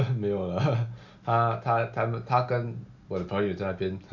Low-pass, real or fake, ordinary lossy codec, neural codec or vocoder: 7.2 kHz; real; none; none